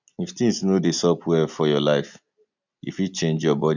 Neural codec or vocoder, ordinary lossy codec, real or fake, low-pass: none; none; real; 7.2 kHz